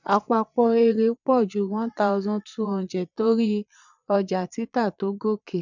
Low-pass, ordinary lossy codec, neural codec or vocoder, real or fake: 7.2 kHz; none; vocoder, 22.05 kHz, 80 mel bands, Vocos; fake